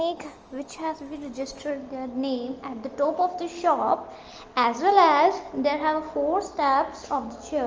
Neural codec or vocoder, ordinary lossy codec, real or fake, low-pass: none; Opus, 24 kbps; real; 7.2 kHz